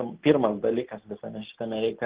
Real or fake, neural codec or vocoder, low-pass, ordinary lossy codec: real; none; 3.6 kHz; Opus, 16 kbps